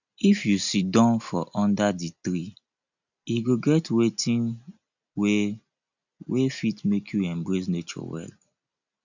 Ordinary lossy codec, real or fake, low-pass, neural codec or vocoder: none; real; 7.2 kHz; none